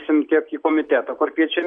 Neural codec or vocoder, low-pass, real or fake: none; 9.9 kHz; real